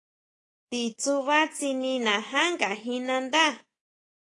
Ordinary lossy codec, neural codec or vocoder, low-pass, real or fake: AAC, 32 kbps; codec, 24 kHz, 3.1 kbps, DualCodec; 10.8 kHz; fake